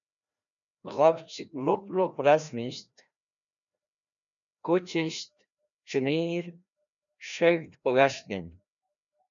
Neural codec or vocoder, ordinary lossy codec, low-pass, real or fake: codec, 16 kHz, 1 kbps, FreqCodec, larger model; MP3, 96 kbps; 7.2 kHz; fake